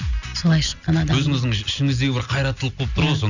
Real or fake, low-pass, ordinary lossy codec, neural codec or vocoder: real; 7.2 kHz; none; none